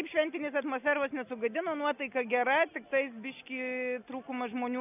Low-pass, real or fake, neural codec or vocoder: 3.6 kHz; real; none